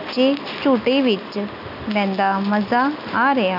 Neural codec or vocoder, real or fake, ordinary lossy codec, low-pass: none; real; none; 5.4 kHz